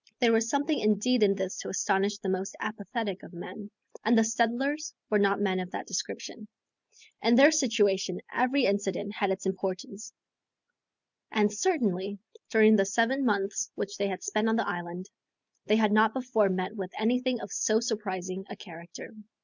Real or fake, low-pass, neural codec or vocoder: real; 7.2 kHz; none